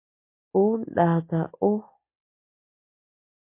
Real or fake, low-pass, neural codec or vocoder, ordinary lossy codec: real; 3.6 kHz; none; MP3, 24 kbps